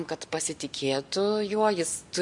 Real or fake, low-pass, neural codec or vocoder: real; 10.8 kHz; none